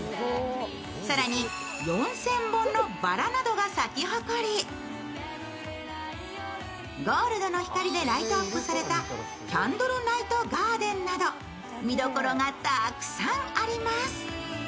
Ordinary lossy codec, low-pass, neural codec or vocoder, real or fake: none; none; none; real